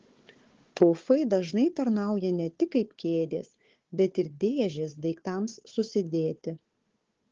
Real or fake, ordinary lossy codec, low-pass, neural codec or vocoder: fake; Opus, 16 kbps; 7.2 kHz; codec, 16 kHz, 4 kbps, FunCodec, trained on Chinese and English, 50 frames a second